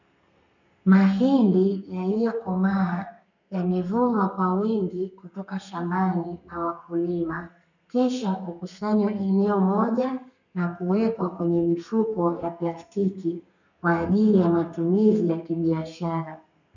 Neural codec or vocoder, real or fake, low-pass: codec, 32 kHz, 1.9 kbps, SNAC; fake; 7.2 kHz